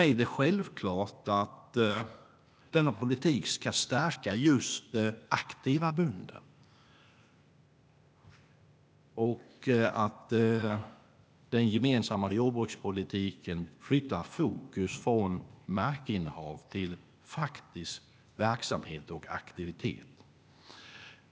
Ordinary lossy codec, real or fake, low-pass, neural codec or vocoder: none; fake; none; codec, 16 kHz, 0.8 kbps, ZipCodec